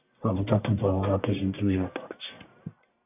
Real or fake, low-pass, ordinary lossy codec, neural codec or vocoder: fake; 3.6 kHz; AAC, 32 kbps; codec, 44.1 kHz, 1.7 kbps, Pupu-Codec